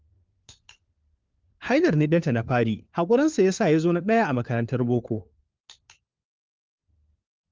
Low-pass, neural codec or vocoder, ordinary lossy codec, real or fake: 7.2 kHz; codec, 16 kHz, 4 kbps, FunCodec, trained on LibriTTS, 50 frames a second; Opus, 24 kbps; fake